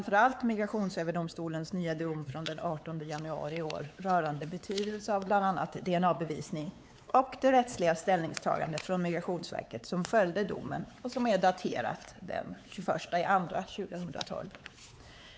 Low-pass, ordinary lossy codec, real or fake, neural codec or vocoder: none; none; fake; codec, 16 kHz, 4 kbps, X-Codec, WavLM features, trained on Multilingual LibriSpeech